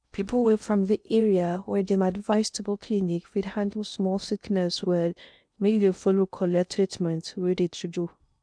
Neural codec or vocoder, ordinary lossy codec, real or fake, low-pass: codec, 16 kHz in and 24 kHz out, 0.6 kbps, FocalCodec, streaming, 2048 codes; none; fake; 9.9 kHz